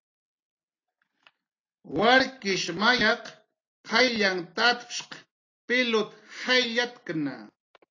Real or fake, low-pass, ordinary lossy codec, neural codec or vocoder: real; 7.2 kHz; AAC, 32 kbps; none